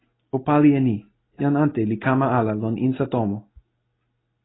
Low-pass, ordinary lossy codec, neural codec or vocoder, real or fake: 7.2 kHz; AAC, 16 kbps; none; real